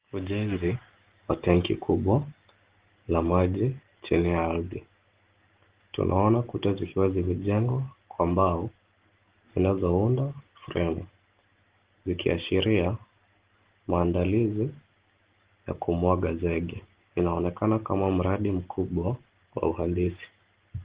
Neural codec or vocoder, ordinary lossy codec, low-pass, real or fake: none; Opus, 16 kbps; 3.6 kHz; real